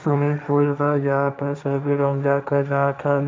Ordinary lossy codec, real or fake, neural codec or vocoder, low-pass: none; fake; codec, 16 kHz, 1.1 kbps, Voila-Tokenizer; none